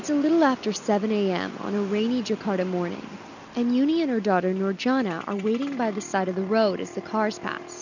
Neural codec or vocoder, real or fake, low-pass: none; real; 7.2 kHz